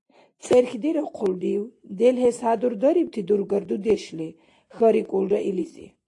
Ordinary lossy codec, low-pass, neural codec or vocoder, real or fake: AAC, 48 kbps; 10.8 kHz; none; real